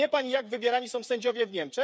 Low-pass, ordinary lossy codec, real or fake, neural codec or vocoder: none; none; fake; codec, 16 kHz, 8 kbps, FreqCodec, smaller model